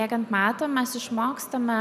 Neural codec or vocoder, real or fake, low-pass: none; real; 14.4 kHz